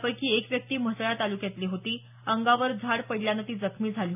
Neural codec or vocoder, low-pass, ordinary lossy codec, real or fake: none; 3.6 kHz; none; real